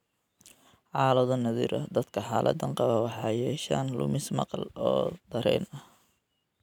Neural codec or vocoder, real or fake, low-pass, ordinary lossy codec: none; real; 19.8 kHz; none